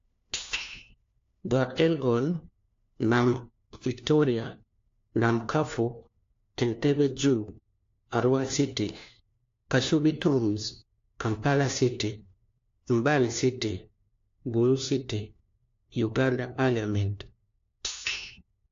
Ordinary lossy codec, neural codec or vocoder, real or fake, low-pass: AAC, 48 kbps; codec, 16 kHz, 1 kbps, FunCodec, trained on LibriTTS, 50 frames a second; fake; 7.2 kHz